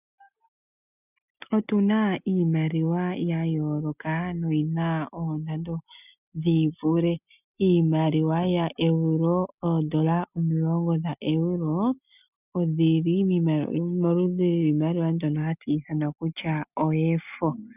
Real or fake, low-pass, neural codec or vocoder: real; 3.6 kHz; none